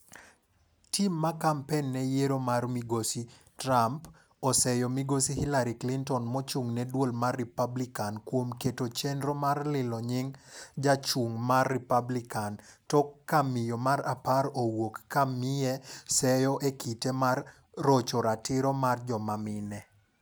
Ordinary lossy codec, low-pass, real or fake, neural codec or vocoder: none; none; real; none